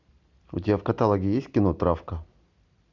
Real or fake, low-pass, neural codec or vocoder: real; 7.2 kHz; none